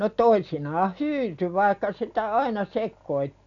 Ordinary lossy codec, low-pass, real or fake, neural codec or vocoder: none; 7.2 kHz; real; none